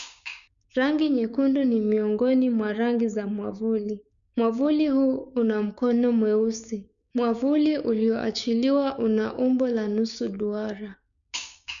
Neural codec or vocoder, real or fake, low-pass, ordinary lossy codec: codec, 16 kHz, 6 kbps, DAC; fake; 7.2 kHz; none